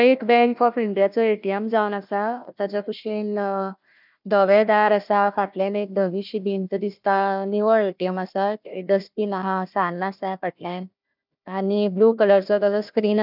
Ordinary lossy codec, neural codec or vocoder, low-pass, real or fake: none; codec, 16 kHz, 1 kbps, FunCodec, trained on Chinese and English, 50 frames a second; 5.4 kHz; fake